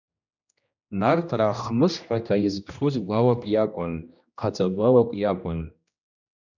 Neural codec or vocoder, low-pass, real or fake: codec, 16 kHz, 1 kbps, X-Codec, HuBERT features, trained on general audio; 7.2 kHz; fake